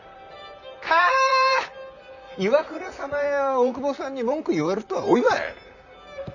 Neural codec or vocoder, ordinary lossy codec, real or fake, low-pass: vocoder, 44.1 kHz, 128 mel bands, Pupu-Vocoder; none; fake; 7.2 kHz